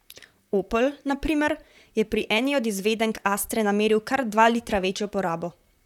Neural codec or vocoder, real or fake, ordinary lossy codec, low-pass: vocoder, 44.1 kHz, 128 mel bands, Pupu-Vocoder; fake; none; 19.8 kHz